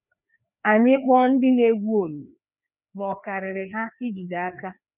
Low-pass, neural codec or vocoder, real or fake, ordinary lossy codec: 3.6 kHz; codec, 16 kHz, 2 kbps, FreqCodec, larger model; fake; none